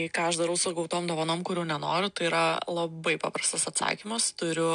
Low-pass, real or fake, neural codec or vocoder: 9.9 kHz; real; none